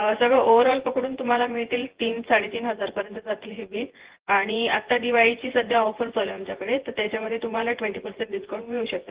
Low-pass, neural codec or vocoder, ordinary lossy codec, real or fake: 3.6 kHz; vocoder, 24 kHz, 100 mel bands, Vocos; Opus, 16 kbps; fake